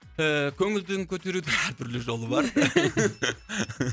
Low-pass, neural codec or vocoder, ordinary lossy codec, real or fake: none; none; none; real